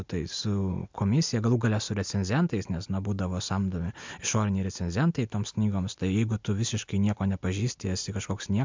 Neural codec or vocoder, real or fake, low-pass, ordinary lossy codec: none; real; 7.2 kHz; MP3, 64 kbps